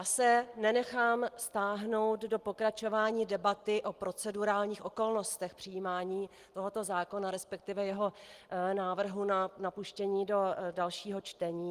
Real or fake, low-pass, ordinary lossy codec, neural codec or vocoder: real; 14.4 kHz; Opus, 24 kbps; none